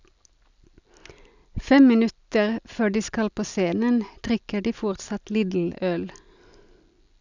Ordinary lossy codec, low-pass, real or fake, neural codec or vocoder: none; 7.2 kHz; real; none